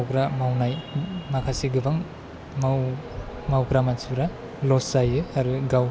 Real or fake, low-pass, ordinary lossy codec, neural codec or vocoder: real; none; none; none